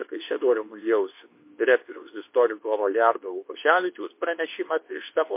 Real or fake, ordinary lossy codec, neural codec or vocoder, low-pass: fake; MP3, 24 kbps; codec, 24 kHz, 0.9 kbps, WavTokenizer, medium speech release version 2; 3.6 kHz